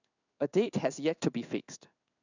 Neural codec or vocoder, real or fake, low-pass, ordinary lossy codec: codec, 16 kHz in and 24 kHz out, 1 kbps, XY-Tokenizer; fake; 7.2 kHz; none